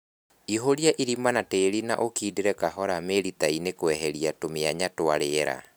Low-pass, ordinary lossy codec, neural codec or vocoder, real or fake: none; none; none; real